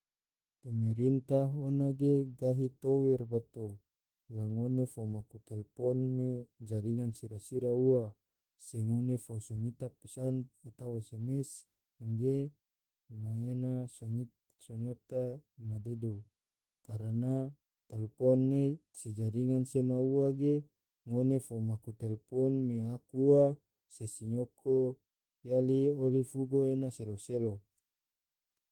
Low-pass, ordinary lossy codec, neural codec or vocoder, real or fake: 19.8 kHz; Opus, 16 kbps; autoencoder, 48 kHz, 32 numbers a frame, DAC-VAE, trained on Japanese speech; fake